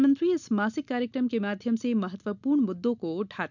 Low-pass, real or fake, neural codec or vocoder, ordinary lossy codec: 7.2 kHz; real; none; none